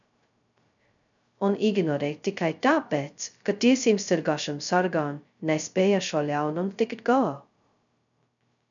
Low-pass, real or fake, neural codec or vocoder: 7.2 kHz; fake; codec, 16 kHz, 0.2 kbps, FocalCodec